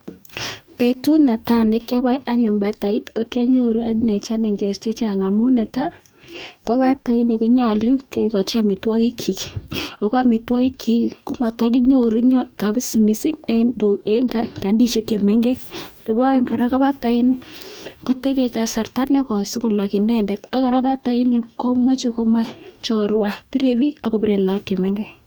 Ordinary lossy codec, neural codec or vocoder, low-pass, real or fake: none; codec, 44.1 kHz, 2.6 kbps, DAC; none; fake